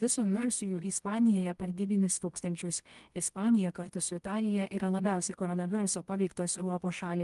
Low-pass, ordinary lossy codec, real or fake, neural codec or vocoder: 10.8 kHz; Opus, 32 kbps; fake; codec, 24 kHz, 0.9 kbps, WavTokenizer, medium music audio release